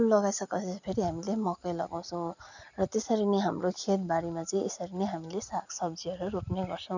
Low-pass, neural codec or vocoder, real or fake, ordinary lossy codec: 7.2 kHz; none; real; none